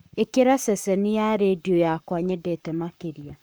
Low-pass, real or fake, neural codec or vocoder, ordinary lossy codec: none; fake; codec, 44.1 kHz, 7.8 kbps, Pupu-Codec; none